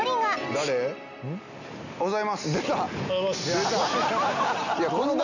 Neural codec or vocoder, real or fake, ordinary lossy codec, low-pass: none; real; none; 7.2 kHz